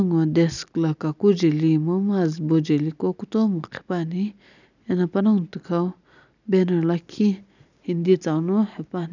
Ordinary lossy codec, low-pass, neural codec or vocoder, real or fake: none; 7.2 kHz; none; real